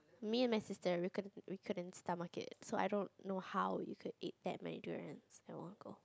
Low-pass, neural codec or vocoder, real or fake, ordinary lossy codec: none; none; real; none